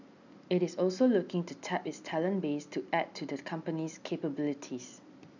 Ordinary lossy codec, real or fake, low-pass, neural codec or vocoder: none; real; 7.2 kHz; none